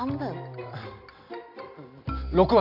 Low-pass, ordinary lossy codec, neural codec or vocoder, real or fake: 5.4 kHz; none; none; real